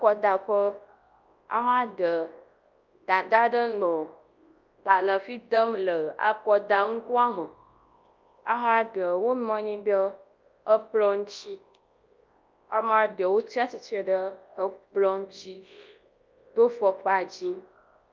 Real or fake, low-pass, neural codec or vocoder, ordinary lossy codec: fake; 7.2 kHz; codec, 24 kHz, 0.9 kbps, WavTokenizer, large speech release; Opus, 24 kbps